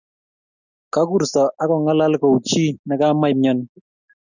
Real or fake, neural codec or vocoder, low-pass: real; none; 7.2 kHz